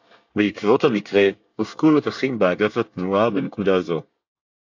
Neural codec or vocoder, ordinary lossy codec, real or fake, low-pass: codec, 24 kHz, 1 kbps, SNAC; AAC, 48 kbps; fake; 7.2 kHz